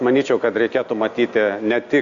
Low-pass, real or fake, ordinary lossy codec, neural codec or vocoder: 7.2 kHz; real; Opus, 64 kbps; none